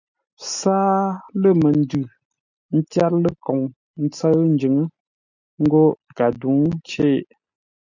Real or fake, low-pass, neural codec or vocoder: real; 7.2 kHz; none